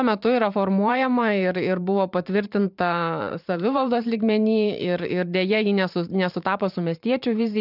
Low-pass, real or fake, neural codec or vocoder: 5.4 kHz; fake; vocoder, 44.1 kHz, 128 mel bands every 512 samples, BigVGAN v2